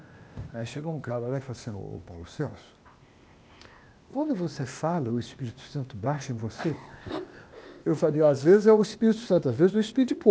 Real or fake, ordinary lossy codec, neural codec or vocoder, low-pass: fake; none; codec, 16 kHz, 0.8 kbps, ZipCodec; none